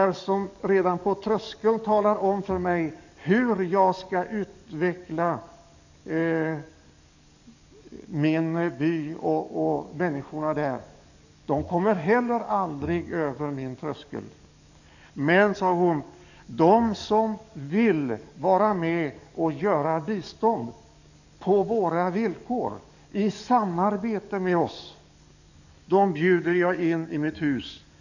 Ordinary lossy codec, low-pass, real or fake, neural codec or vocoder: none; 7.2 kHz; fake; codec, 44.1 kHz, 7.8 kbps, DAC